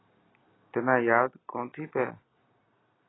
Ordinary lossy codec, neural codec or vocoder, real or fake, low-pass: AAC, 16 kbps; none; real; 7.2 kHz